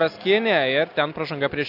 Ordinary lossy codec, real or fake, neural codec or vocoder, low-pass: AAC, 48 kbps; real; none; 5.4 kHz